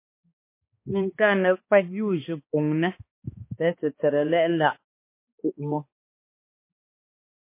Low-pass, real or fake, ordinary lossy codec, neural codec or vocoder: 3.6 kHz; fake; MP3, 24 kbps; codec, 16 kHz, 2 kbps, X-Codec, HuBERT features, trained on balanced general audio